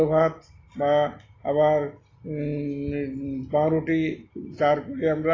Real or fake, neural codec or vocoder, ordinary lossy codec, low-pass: real; none; AAC, 32 kbps; 7.2 kHz